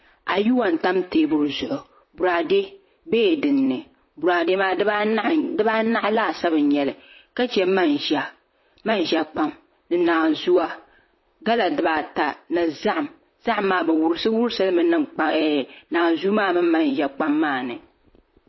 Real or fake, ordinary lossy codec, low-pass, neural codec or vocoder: fake; MP3, 24 kbps; 7.2 kHz; vocoder, 44.1 kHz, 128 mel bands, Pupu-Vocoder